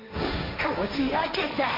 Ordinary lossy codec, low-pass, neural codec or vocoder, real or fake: MP3, 32 kbps; 5.4 kHz; codec, 16 kHz, 1.1 kbps, Voila-Tokenizer; fake